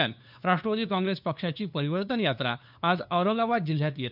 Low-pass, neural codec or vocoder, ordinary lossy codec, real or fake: 5.4 kHz; codec, 16 kHz, 2 kbps, FunCodec, trained on LibriTTS, 25 frames a second; none; fake